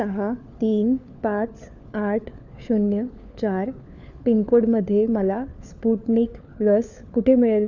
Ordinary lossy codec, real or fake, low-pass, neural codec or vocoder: none; fake; 7.2 kHz; codec, 16 kHz, 4 kbps, FunCodec, trained on LibriTTS, 50 frames a second